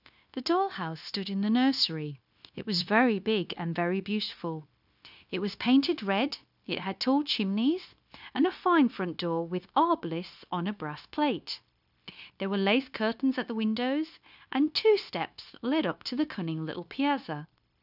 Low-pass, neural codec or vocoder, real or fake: 5.4 kHz; codec, 16 kHz, 0.9 kbps, LongCat-Audio-Codec; fake